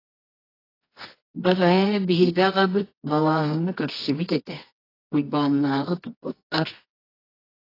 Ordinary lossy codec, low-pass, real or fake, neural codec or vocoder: AAC, 24 kbps; 5.4 kHz; fake; codec, 24 kHz, 0.9 kbps, WavTokenizer, medium music audio release